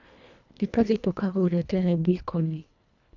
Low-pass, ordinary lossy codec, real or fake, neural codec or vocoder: 7.2 kHz; none; fake; codec, 24 kHz, 1.5 kbps, HILCodec